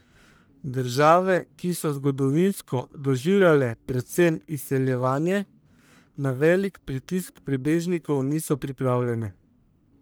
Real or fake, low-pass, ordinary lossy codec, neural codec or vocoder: fake; none; none; codec, 44.1 kHz, 1.7 kbps, Pupu-Codec